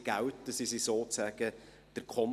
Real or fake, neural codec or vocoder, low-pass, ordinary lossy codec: real; none; 14.4 kHz; none